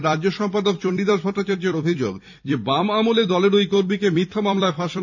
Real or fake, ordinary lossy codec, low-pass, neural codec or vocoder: fake; none; 7.2 kHz; vocoder, 44.1 kHz, 128 mel bands every 256 samples, BigVGAN v2